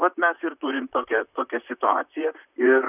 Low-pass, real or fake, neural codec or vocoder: 3.6 kHz; fake; vocoder, 44.1 kHz, 128 mel bands, Pupu-Vocoder